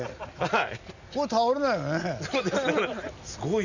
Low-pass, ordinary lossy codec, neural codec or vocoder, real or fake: 7.2 kHz; none; none; real